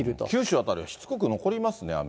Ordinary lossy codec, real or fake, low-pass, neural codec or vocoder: none; real; none; none